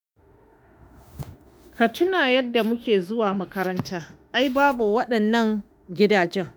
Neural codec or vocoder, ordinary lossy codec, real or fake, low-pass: autoencoder, 48 kHz, 32 numbers a frame, DAC-VAE, trained on Japanese speech; none; fake; none